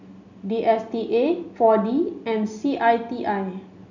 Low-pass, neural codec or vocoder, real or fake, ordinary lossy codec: 7.2 kHz; none; real; none